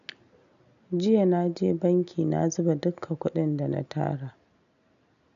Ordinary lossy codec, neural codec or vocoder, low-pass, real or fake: none; none; 7.2 kHz; real